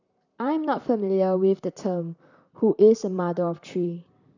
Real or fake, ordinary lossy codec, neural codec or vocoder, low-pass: fake; none; vocoder, 44.1 kHz, 128 mel bands, Pupu-Vocoder; 7.2 kHz